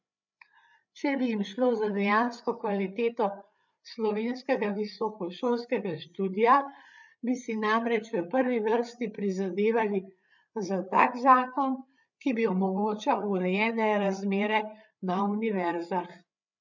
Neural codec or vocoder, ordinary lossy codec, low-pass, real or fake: codec, 16 kHz, 8 kbps, FreqCodec, larger model; none; 7.2 kHz; fake